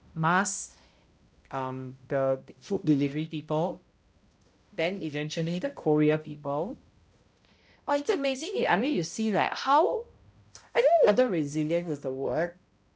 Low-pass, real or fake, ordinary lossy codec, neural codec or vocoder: none; fake; none; codec, 16 kHz, 0.5 kbps, X-Codec, HuBERT features, trained on balanced general audio